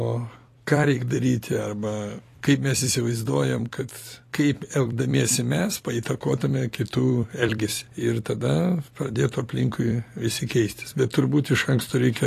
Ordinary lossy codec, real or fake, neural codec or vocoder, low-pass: AAC, 48 kbps; fake; vocoder, 44.1 kHz, 128 mel bands every 256 samples, BigVGAN v2; 14.4 kHz